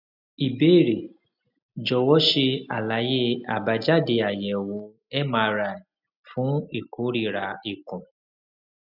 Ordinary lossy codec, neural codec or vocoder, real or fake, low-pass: none; none; real; 5.4 kHz